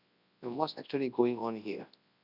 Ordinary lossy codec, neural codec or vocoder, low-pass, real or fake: none; codec, 24 kHz, 0.9 kbps, WavTokenizer, large speech release; 5.4 kHz; fake